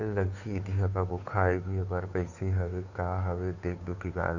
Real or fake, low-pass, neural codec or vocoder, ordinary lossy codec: fake; 7.2 kHz; codec, 16 kHz, 2 kbps, FunCodec, trained on Chinese and English, 25 frames a second; none